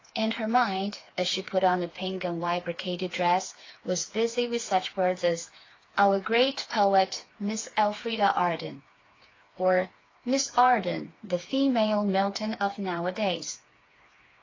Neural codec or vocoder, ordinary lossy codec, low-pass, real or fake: codec, 16 kHz, 4 kbps, FreqCodec, smaller model; AAC, 32 kbps; 7.2 kHz; fake